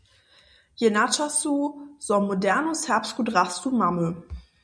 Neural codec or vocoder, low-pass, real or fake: none; 9.9 kHz; real